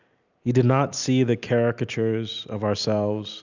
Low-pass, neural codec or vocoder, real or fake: 7.2 kHz; none; real